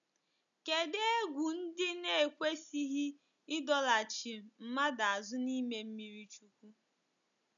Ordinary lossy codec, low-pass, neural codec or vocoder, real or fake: none; 7.2 kHz; none; real